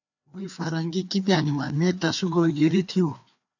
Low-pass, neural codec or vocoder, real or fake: 7.2 kHz; codec, 16 kHz, 2 kbps, FreqCodec, larger model; fake